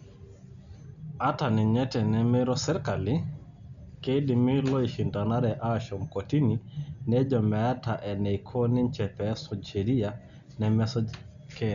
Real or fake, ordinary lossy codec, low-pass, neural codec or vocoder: real; none; 7.2 kHz; none